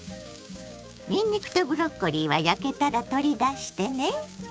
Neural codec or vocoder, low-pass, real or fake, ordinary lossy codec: codec, 16 kHz, 6 kbps, DAC; none; fake; none